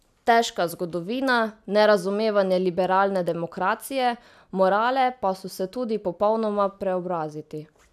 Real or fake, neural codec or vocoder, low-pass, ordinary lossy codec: real; none; 14.4 kHz; none